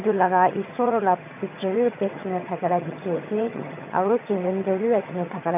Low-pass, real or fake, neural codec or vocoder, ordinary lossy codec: 3.6 kHz; fake; vocoder, 22.05 kHz, 80 mel bands, HiFi-GAN; none